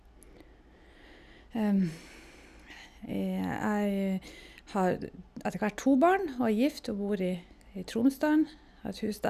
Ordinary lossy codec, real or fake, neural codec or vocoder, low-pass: none; real; none; 14.4 kHz